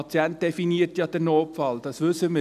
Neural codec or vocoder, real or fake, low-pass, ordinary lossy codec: none; real; 14.4 kHz; none